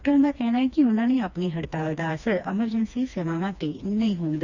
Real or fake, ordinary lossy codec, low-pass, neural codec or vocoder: fake; Opus, 64 kbps; 7.2 kHz; codec, 16 kHz, 2 kbps, FreqCodec, smaller model